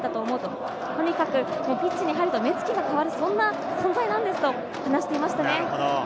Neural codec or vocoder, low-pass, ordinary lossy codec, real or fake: none; none; none; real